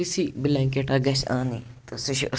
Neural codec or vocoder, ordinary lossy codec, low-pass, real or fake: none; none; none; real